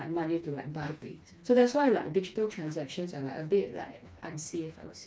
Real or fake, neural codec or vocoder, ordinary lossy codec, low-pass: fake; codec, 16 kHz, 2 kbps, FreqCodec, smaller model; none; none